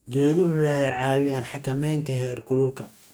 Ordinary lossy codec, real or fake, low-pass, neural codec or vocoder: none; fake; none; codec, 44.1 kHz, 2.6 kbps, DAC